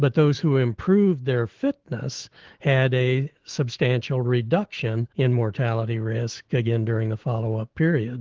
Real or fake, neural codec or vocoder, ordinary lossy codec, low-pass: fake; autoencoder, 48 kHz, 128 numbers a frame, DAC-VAE, trained on Japanese speech; Opus, 16 kbps; 7.2 kHz